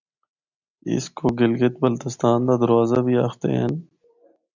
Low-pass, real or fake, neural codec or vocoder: 7.2 kHz; real; none